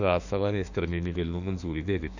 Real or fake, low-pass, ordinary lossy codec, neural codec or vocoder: fake; 7.2 kHz; none; autoencoder, 48 kHz, 32 numbers a frame, DAC-VAE, trained on Japanese speech